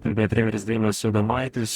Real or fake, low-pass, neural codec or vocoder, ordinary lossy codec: fake; 19.8 kHz; codec, 44.1 kHz, 0.9 kbps, DAC; Opus, 64 kbps